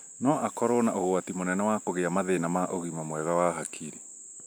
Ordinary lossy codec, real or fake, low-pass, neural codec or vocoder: none; real; none; none